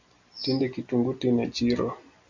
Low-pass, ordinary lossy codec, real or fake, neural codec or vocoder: 7.2 kHz; MP3, 64 kbps; real; none